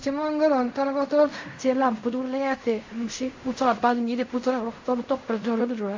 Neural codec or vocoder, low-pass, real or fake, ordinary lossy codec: codec, 16 kHz in and 24 kHz out, 0.4 kbps, LongCat-Audio-Codec, fine tuned four codebook decoder; 7.2 kHz; fake; MP3, 64 kbps